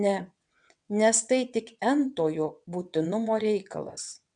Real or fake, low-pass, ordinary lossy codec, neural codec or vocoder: fake; 9.9 kHz; MP3, 96 kbps; vocoder, 22.05 kHz, 80 mel bands, WaveNeXt